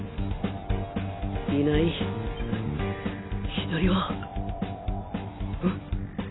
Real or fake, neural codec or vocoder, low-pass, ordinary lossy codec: real; none; 7.2 kHz; AAC, 16 kbps